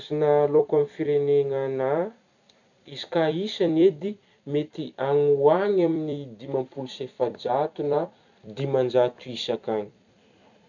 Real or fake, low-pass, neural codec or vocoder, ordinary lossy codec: fake; 7.2 kHz; vocoder, 44.1 kHz, 128 mel bands every 256 samples, BigVGAN v2; none